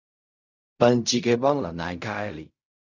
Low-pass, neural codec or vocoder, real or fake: 7.2 kHz; codec, 16 kHz in and 24 kHz out, 0.4 kbps, LongCat-Audio-Codec, fine tuned four codebook decoder; fake